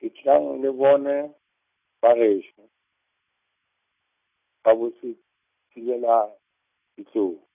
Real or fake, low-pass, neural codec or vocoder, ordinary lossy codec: real; 3.6 kHz; none; none